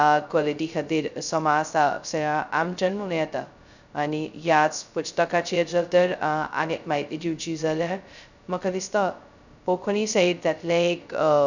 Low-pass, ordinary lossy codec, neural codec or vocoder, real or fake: 7.2 kHz; none; codec, 16 kHz, 0.2 kbps, FocalCodec; fake